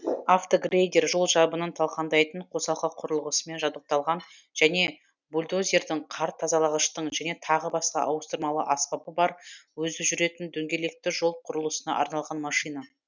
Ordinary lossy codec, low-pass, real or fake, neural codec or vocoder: none; 7.2 kHz; real; none